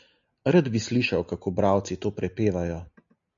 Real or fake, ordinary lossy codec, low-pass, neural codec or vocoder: real; MP3, 96 kbps; 7.2 kHz; none